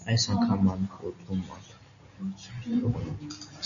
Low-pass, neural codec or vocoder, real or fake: 7.2 kHz; none; real